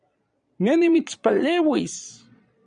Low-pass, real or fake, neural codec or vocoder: 9.9 kHz; fake; vocoder, 22.05 kHz, 80 mel bands, Vocos